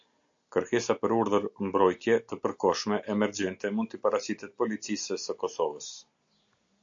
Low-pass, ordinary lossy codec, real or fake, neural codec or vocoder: 7.2 kHz; AAC, 64 kbps; real; none